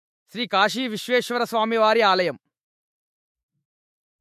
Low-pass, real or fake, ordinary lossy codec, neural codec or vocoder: 14.4 kHz; fake; MP3, 64 kbps; autoencoder, 48 kHz, 128 numbers a frame, DAC-VAE, trained on Japanese speech